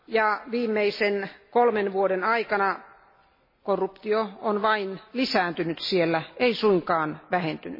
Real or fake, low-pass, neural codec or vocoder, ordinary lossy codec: real; 5.4 kHz; none; MP3, 24 kbps